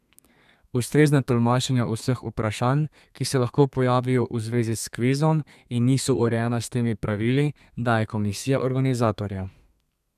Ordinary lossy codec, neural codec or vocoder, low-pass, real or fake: none; codec, 32 kHz, 1.9 kbps, SNAC; 14.4 kHz; fake